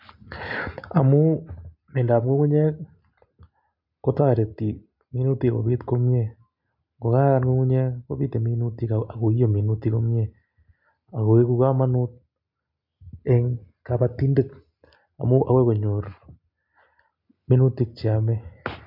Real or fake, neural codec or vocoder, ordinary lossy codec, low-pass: real; none; MP3, 48 kbps; 5.4 kHz